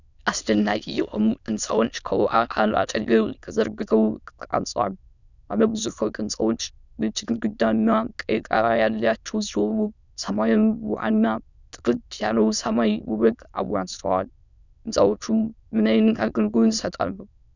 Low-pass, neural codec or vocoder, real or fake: 7.2 kHz; autoencoder, 22.05 kHz, a latent of 192 numbers a frame, VITS, trained on many speakers; fake